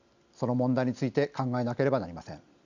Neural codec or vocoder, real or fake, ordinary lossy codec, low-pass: none; real; none; 7.2 kHz